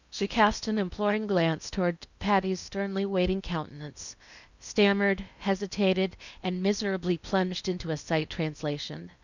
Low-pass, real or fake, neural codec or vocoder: 7.2 kHz; fake; codec, 16 kHz in and 24 kHz out, 0.8 kbps, FocalCodec, streaming, 65536 codes